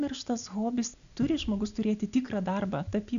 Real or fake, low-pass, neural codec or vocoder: real; 7.2 kHz; none